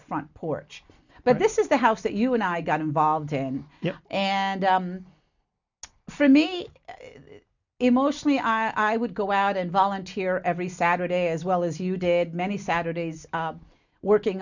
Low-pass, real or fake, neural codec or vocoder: 7.2 kHz; real; none